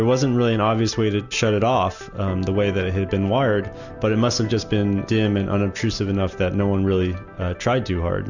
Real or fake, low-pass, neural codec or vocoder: real; 7.2 kHz; none